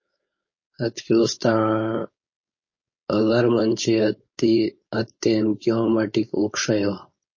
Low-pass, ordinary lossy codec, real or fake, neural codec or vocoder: 7.2 kHz; MP3, 32 kbps; fake; codec, 16 kHz, 4.8 kbps, FACodec